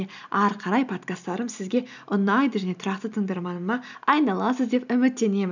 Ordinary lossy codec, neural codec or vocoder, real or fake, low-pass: none; none; real; 7.2 kHz